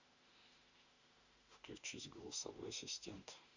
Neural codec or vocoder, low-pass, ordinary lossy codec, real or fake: autoencoder, 48 kHz, 32 numbers a frame, DAC-VAE, trained on Japanese speech; 7.2 kHz; Opus, 64 kbps; fake